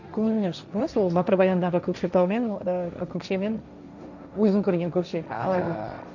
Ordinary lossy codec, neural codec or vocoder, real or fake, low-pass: none; codec, 16 kHz, 1.1 kbps, Voila-Tokenizer; fake; 7.2 kHz